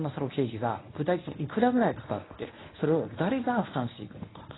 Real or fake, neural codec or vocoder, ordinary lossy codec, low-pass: fake; codec, 24 kHz, 0.9 kbps, WavTokenizer, medium speech release version 1; AAC, 16 kbps; 7.2 kHz